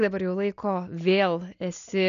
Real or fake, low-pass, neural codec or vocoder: real; 7.2 kHz; none